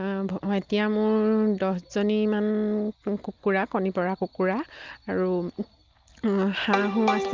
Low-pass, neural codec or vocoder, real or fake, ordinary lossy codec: 7.2 kHz; none; real; Opus, 24 kbps